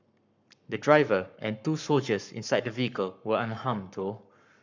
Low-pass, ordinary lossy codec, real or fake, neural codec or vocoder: 7.2 kHz; none; fake; codec, 44.1 kHz, 7.8 kbps, Pupu-Codec